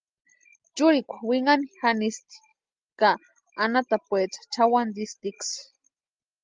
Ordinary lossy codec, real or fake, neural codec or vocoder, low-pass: Opus, 32 kbps; real; none; 7.2 kHz